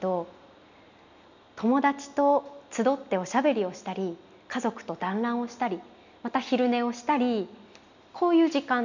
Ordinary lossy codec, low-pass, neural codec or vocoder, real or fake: none; 7.2 kHz; none; real